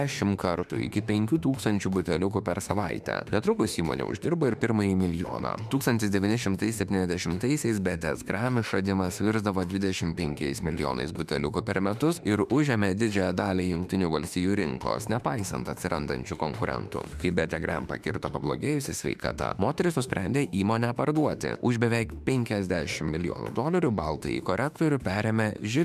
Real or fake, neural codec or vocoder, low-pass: fake; autoencoder, 48 kHz, 32 numbers a frame, DAC-VAE, trained on Japanese speech; 14.4 kHz